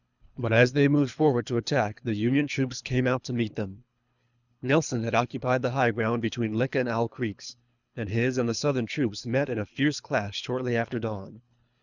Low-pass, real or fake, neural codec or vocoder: 7.2 kHz; fake; codec, 24 kHz, 3 kbps, HILCodec